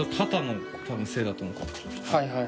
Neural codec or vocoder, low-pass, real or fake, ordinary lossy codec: none; none; real; none